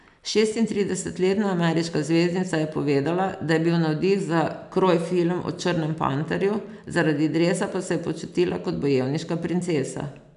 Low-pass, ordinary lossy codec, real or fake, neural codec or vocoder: 10.8 kHz; none; real; none